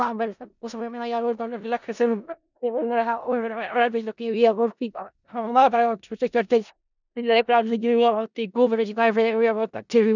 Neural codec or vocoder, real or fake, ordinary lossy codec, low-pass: codec, 16 kHz in and 24 kHz out, 0.4 kbps, LongCat-Audio-Codec, four codebook decoder; fake; none; 7.2 kHz